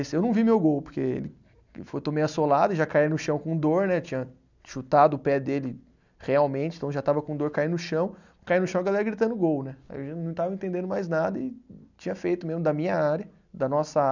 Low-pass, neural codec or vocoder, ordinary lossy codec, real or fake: 7.2 kHz; none; none; real